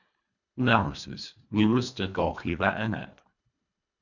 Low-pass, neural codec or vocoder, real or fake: 7.2 kHz; codec, 24 kHz, 1.5 kbps, HILCodec; fake